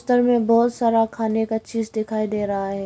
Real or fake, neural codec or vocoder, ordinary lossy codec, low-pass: real; none; none; none